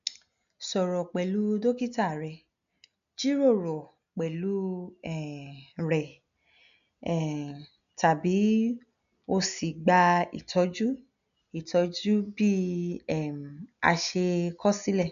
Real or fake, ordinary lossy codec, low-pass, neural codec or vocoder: real; none; 7.2 kHz; none